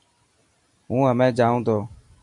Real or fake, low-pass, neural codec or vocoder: real; 10.8 kHz; none